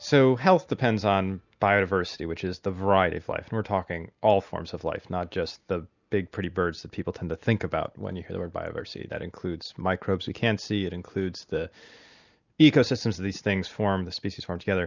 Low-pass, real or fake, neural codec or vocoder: 7.2 kHz; real; none